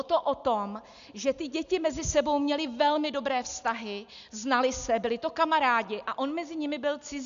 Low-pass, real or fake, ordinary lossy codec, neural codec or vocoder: 7.2 kHz; real; AAC, 96 kbps; none